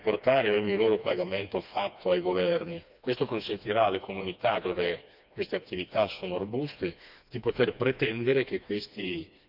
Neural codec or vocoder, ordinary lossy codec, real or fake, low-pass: codec, 16 kHz, 2 kbps, FreqCodec, smaller model; none; fake; 5.4 kHz